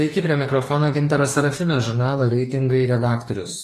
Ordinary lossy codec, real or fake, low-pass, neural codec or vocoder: AAC, 48 kbps; fake; 14.4 kHz; codec, 44.1 kHz, 2.6 kbps, DAC